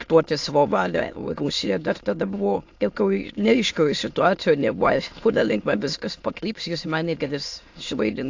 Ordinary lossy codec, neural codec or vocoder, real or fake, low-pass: AAC, 48 kbps; autoencoder, 22.05 kHz, a latent of 192 numbers a frame, VITS, trained on many speakers; fake; 7.2 kHz